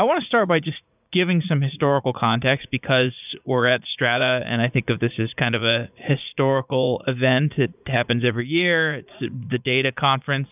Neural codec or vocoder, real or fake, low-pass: vocoder, 44.1 kHz, 80 mel bands, Vocos; fake; 3.6 kHz